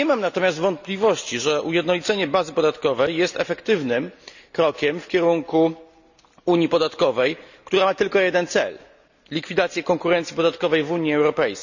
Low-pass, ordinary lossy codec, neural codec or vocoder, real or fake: 7.2 kHz; none; none; real